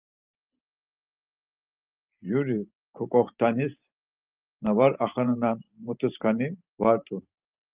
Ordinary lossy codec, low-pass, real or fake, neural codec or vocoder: Opus, 24 kbps; 3.6 kHz; fake; vocoder, 44.1 kHz, 128 mel bands every 512 samples, BigVGAN v2